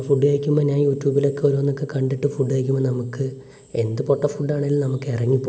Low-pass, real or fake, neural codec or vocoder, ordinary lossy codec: none; real; none; none